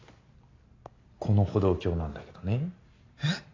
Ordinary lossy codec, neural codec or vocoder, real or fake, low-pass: AAC, 32 kbps; none; real; 7.2 kHz